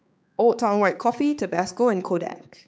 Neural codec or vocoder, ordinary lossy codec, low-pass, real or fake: codec, 16 kHz, 4 kbps, X-Codec, HuBERT features, trained on balanced general audio; none; none; fake